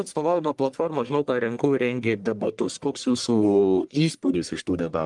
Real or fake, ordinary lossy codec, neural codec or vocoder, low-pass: fake; Opus, 32 kbps; codec, 44.1 kHz, 1.7 kbps, Pupu-Codec; 10.8 kHz